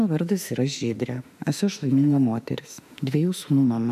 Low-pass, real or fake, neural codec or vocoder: 14.4 kHz; fake; autoencoder, 48 kHz, 32 numbers a frame, DAC-VAE, trained on Japanese speech